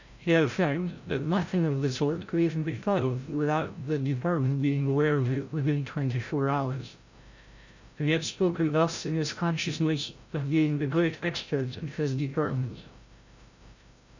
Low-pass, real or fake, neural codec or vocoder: 7.2 kHz; fake; codec, 16 kHz, 0.5 kbps, FreqCodec, larger model